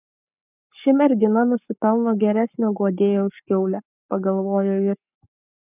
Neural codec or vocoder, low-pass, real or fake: codec, 44.1 kHz, 7.8 kbps, Pupu-Codec; 3.6 kHz; fake